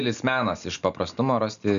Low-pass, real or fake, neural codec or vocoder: 7.2 kHz; real; none